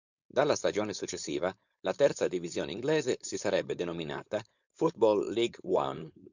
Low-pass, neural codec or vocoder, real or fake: 7.2 kHz; codec, 16 kHz, 4.8 kbps, FACodec; fake